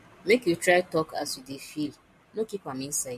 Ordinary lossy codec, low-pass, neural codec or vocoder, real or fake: MP3, 64 kbps; 14.4 kHz; none; real